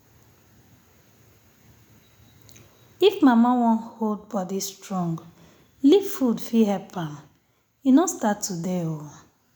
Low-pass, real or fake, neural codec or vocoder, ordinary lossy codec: none; real; none; none